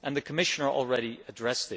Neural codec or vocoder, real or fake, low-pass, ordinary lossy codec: none; real; none; none